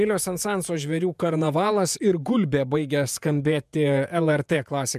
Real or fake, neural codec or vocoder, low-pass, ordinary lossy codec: fake; vocoder, 48 kHz, 128 mel bands, Vocos; 14.4 kHz; MP3, 96 kbps